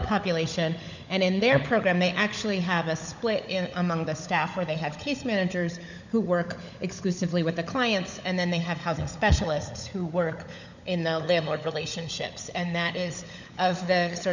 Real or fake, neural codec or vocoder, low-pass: fake; codec, 16 kHz, 16 kbps, FunCodec, trained on LibriTTS, 50 frames a second; 7.2 kHz